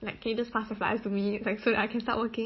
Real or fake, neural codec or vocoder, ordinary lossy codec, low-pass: real; none; MP3, 24 kbps; 7.2 kHz